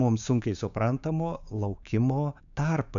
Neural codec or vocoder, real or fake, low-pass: codec, 16 kHz, 6 kbps, DAC; fake; 7.2 kHz